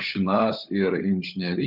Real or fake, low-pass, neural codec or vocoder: fake; 5.4 kHz; codec, 16 kHz, 16 kbps, FunCodec, trained on LibriTTS, 50 frames a second